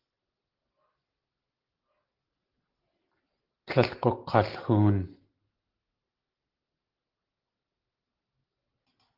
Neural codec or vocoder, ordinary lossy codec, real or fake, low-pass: none; Opus, 16 kbps; real; 5.4 kHz